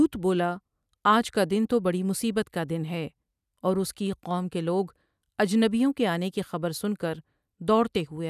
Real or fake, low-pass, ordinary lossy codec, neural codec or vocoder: real; 14.4 kHz; none; none